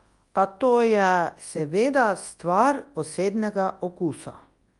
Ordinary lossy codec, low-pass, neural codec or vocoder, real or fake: Opus, 32 kbps; 10.8 kHz; codec, 24 kHz, 0.9 kbps, WavTokenizer, large speech release; fake